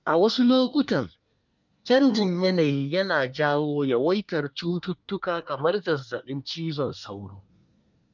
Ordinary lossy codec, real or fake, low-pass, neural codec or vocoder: none; fake; 7.2 kHz; codec, 24 kHz, 1 kbps, SNAC